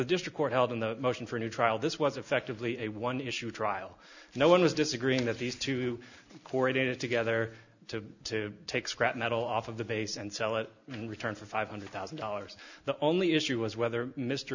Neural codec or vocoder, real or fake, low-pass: none; real; 7.2 kHz